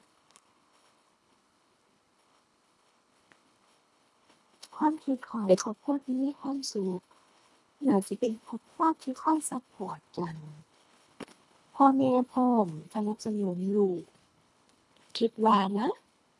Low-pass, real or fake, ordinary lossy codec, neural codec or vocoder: none; fake; none; codec, 24 kHz, 1.5 kbps, HILCodec